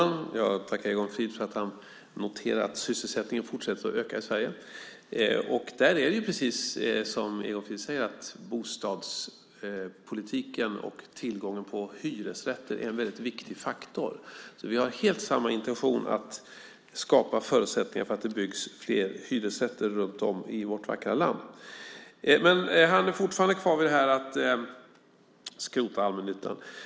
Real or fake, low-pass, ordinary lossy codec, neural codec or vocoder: real; none; none; none